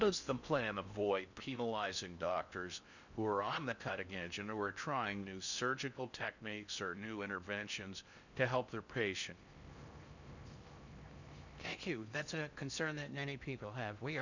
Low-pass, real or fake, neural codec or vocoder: 7.2 kHz; fake; codec, 16 kHz in and 24 kHz out, 0.6 kbps, FocalCodec, streaming, 4096 codes